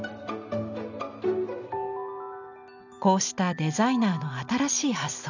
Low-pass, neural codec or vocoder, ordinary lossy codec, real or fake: 7.2 kHz; none; none; real